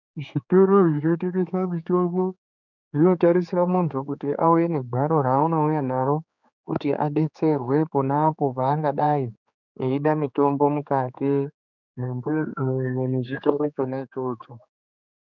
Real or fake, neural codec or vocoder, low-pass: fake; codec, 16 kHz, 4 kbps, X-Codec, HuBERT features, trained on general audio; 7.2 kHz